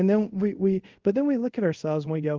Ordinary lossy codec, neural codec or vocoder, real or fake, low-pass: Opus, 32 kbps; codec, 24 kHz, 0.5 kbps, DualCodec; fake; 7.2 kHz